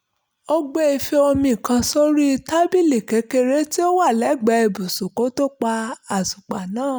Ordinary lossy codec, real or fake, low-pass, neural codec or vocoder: none; real; none; none